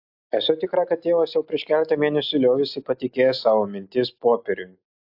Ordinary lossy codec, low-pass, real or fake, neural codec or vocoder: AAC, 48 kbps; 5.4 kHz; real; none